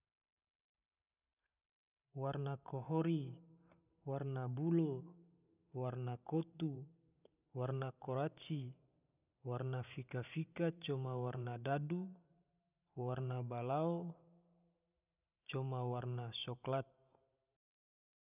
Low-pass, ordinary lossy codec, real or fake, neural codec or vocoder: 3.6 kHz; none; fake; vocoder, 44.1 kHz, 128 mel bands every 512 samples, BigVGAN v2